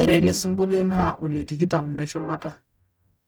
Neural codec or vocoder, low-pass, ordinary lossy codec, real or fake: codec, 44.1 kHz, 0.9 kbps, DAC; none; none; fake